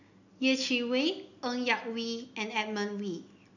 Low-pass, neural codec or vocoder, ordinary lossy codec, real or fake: 7.2 kHz; none; none; real